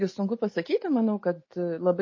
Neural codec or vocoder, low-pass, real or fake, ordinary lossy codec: none; 7.2 kHz; real; MP3, 32 kbps